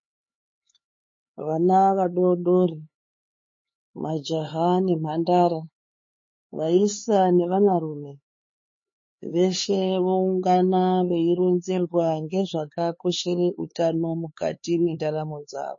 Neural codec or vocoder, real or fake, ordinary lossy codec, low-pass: codec, 16 kHz, 4 kbps, X-Codec, HuBERT features, trained on LibriSpeech; fake; MP3, 32 kbps; 7.2 kHz